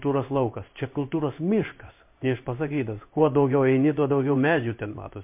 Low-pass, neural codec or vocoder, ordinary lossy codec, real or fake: 3.6 kHz; codec, 16 kHz in and 24 kHz out, 1 kbps, XY-Tokenizer; MP3, 32 kbps; fake